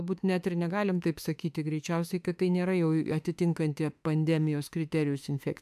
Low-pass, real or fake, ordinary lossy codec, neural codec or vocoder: 14.4 kHz; fake; AAC, 96 kbps; autoencoder, 48 kHz, 32 numbers a frame, DAC-VAE, trained on Japanese speech